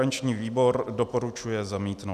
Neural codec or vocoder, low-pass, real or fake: none; 14.4 kHz; real